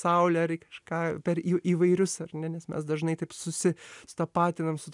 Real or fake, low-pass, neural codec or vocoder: real; 10.8 kHz; none